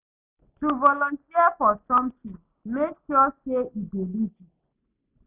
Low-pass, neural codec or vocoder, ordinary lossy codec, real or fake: 3.6 kHz; none; none; real